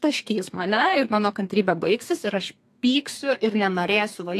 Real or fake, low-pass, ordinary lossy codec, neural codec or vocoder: fake; 14.4 kHz; AAC, 64 kbps; codec, 32 kHz, 1.9 kbps, SNAC